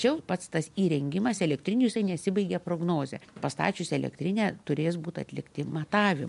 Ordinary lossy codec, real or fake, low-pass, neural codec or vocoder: MP3, 64 kbps; real; 10.8 kHz; none